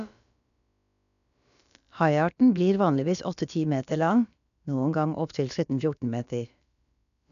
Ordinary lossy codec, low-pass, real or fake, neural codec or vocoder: none; 7.2 kHz; fake; codec, 16 kHz, about 1 kbps, DyCAST, with the encoder's durations